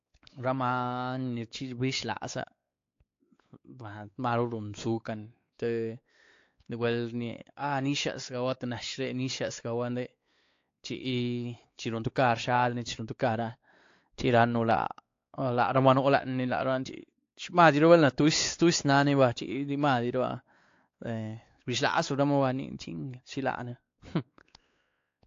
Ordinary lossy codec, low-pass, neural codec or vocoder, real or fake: AAC, 48 kbps; 7.2 kHz; codec, 16 kHz, 4 kbps, X-Codec, WavLM features, trained on Multilingual LibriSpeech; fake